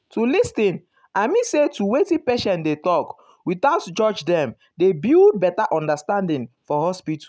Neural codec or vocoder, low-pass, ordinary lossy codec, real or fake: none; none; none; real